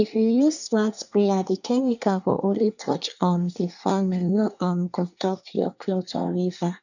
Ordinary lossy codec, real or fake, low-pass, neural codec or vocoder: none; fake; 7.2 kHz; codec, 24 kHz, 1 kbps, SNAC